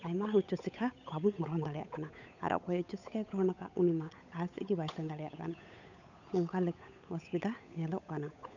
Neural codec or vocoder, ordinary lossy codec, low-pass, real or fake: codec, 16 kHz, 8 kbps, FunCodec, trained on Chinese and English, 25 frames a second; none; 7.2 kHz; fake